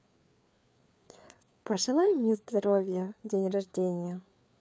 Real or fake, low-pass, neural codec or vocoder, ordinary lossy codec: fake; none; codec, 16 kHz, 4 kbps, FreqCodec, larger model; none